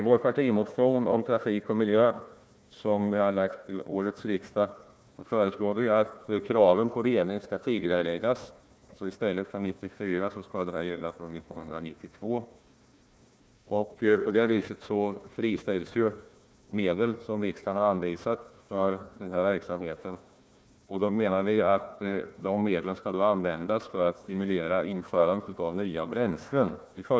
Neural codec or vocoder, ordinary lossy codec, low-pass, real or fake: codec, 16 kHz, 1 kbps, FunCodec, trained on Chinese and English, 50 frames a second; none; none; fake